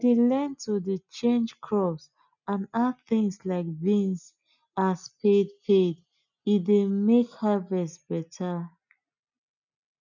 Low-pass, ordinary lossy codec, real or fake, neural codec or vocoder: 7.2 kHz; none; real; none